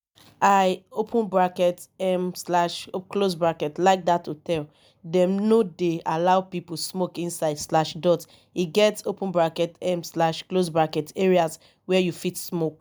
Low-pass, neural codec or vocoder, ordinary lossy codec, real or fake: none; none; none; real